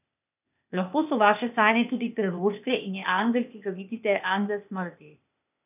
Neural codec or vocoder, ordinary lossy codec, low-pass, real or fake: codec, 16 kHz, 0.8 kbps, ZipCodec; none; 3.6 kHz; fake